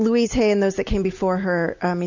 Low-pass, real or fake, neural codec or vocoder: 7.2 kHz; real; none